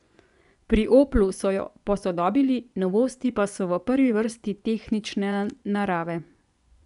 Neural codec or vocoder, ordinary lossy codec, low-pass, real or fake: vocoder, 24 kHz, 100 mel bands, Vocos; none; 10.8 kHz; fake